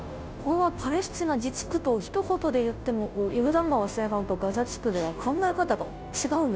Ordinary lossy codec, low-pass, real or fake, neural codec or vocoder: none; none; fake; codec, 16 kHz, 0.5 kbps, FunCodec, trained on Chinese and English, 25 frames a second